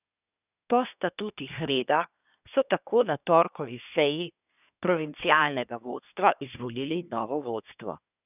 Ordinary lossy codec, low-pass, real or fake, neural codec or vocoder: none; 3.6 kHz; fake; codec, 24 kHz, 1 kbps, SNAC